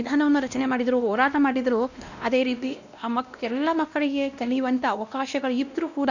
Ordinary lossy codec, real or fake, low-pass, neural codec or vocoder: none; fake; 7.2 kHz; codec, 16 kHz, 1 kbps, X-Codec, WavLM features, trained on Multilingual LibriSpeech